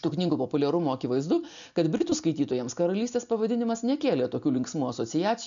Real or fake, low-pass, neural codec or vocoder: real; 7.2 kHz; none